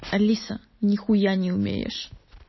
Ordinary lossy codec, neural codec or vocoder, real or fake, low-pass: MP3, 24 kbps; none; real; 7.2 kHz